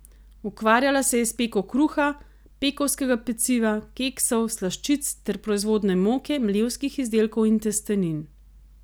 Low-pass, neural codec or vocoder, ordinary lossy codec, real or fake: none; none; none; real